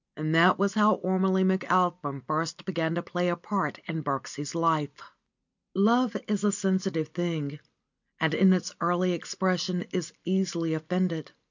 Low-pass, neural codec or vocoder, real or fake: 7.2 kHz; none; real